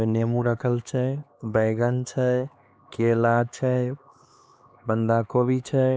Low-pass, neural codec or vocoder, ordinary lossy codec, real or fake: none; codec, 16 kHz, 2 kbps, X-Codec, HuBERT features, trained on LibriSpeech; none; fake